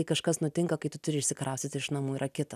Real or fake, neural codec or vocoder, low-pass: real; none; 14.4 kHz